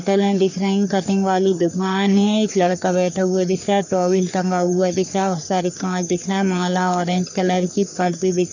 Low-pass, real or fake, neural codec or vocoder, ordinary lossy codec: 7.2 kHz; fake; codec, 44.1 kHz, 3.4 kbps, Pupu-Codec; none